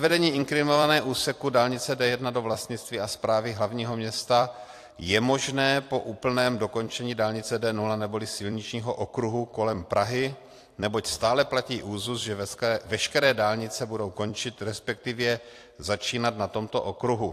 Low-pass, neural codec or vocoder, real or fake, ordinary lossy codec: 14.4 kHz; vocoder, 44.1 kHz, 128 mel bands every 256 samples, BigVGAN v2; fake; AAC, 64 kbps